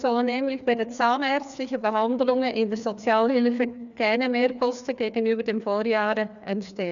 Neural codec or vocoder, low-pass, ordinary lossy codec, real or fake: codec, 16 kHz, 2 kbps, FreqCodec, larger model; 7.2 kHz; none; fake